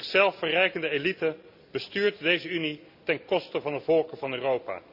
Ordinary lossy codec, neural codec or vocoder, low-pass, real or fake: none; none; 5.4 kHz; real